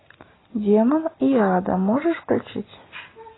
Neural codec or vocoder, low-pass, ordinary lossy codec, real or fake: none; 7.2 kHz; AAC, 16 kbps; real